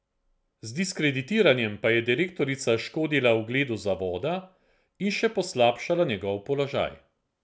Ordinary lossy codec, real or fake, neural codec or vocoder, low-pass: none; real; none; none